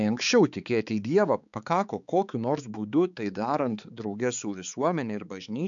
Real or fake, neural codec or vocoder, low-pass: fake; codec, 16 kHz, 4 kbps, X-Codec, HuBERT features, trained on balanced general audio; 7.2 kHz